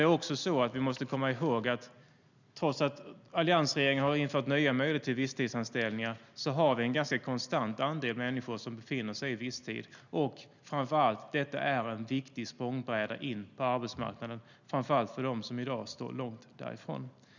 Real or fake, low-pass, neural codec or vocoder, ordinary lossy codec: real; 7.2 kHz; none; none